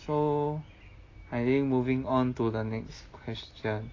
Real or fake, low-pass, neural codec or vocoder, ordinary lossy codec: real; 7.2 kHz; none; AAC, 32 kbps